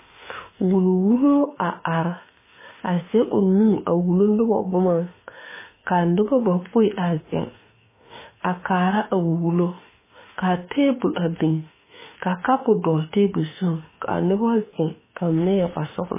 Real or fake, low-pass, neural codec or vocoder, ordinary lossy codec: fake; 3.6 kHz; autoencoder, 48 kHz, 32 numbers a frame, DAC-VAE, trained on Japanese speech; MP3, 16 kbps